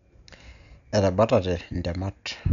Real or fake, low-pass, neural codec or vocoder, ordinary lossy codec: real; 7.2 kHz; none; none